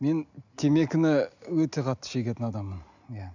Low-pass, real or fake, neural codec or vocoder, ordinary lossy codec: 7.2 kHz; real; none; none